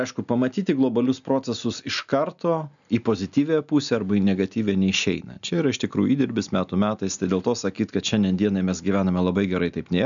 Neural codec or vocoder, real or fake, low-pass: none; real; 7.2 kHz